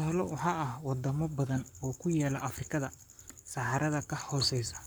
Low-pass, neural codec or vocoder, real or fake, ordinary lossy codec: none; codec, 44.1 kHz, 7.8 kbps, Pupu-Codec; fake; none